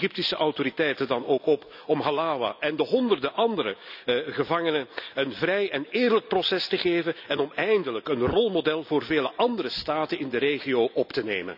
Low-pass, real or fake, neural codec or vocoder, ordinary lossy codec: 5.4 kHz; real; none; none